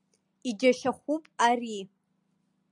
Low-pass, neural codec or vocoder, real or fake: 10.8 kHz; none; real